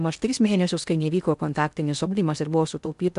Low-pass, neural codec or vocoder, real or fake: 10.8 kHz; codec, 16 kHz in and 24 kHz out, 0.6 kbps, FocalCodec, streaming, 2048 codes; fake